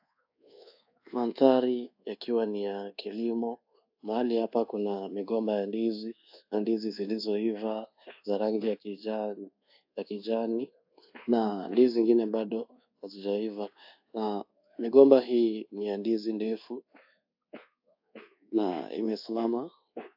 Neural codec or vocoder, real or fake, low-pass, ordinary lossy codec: codec, 24 kHz, 1.2 kbps, DualCodec; fake; 5.4 kHz; AAC, 48 kbps